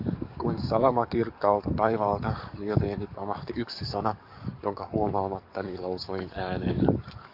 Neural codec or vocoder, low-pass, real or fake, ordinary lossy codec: codec, 44.1 kHz, 7.8 kbps, DAC; 5.4 kHz; fake; MP3, 48 kbps